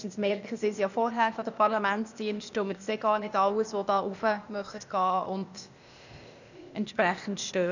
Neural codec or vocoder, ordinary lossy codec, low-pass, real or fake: codec, 16 kHz, 0.8 kbps, ZipCodec; none; 7.2 kHz; fake